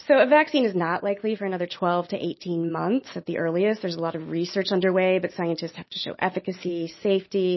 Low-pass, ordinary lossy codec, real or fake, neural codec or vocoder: 7.2 kHz; MP3, 24 kbps; real; none